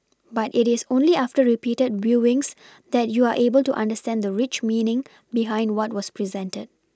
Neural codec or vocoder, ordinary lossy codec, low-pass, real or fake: none; none; none; real